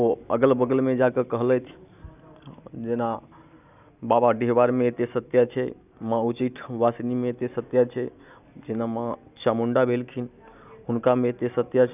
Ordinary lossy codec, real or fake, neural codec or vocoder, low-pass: none; real; none; 3.6 kHz